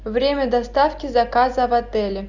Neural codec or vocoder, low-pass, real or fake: none; 7.2 kHz; real